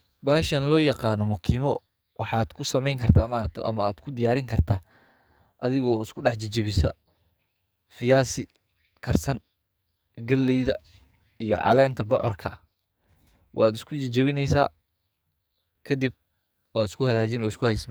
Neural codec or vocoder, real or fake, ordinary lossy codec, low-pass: codec, 44.1 kHz, 2.6 kbps, SNAC; fake; none; none